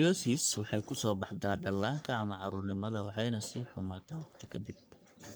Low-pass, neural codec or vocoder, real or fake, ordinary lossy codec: none; codec, 44.1 kHz, 3.4 kbps, Pupu-Codec; fake; none